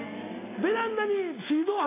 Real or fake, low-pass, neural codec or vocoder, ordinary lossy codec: real; 3.6 kHz; none; AAC, 16 kbps